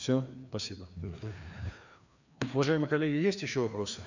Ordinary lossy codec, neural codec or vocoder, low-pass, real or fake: none; codec, 16 kHz, 2 kbps, FreqCodec, larger model; 7.2 kHz; fake